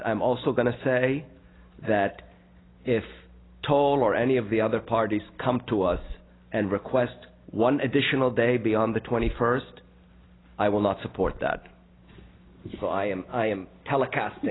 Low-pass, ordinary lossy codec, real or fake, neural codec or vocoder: 7.2 kHz; AAC, 16 kbps; real; none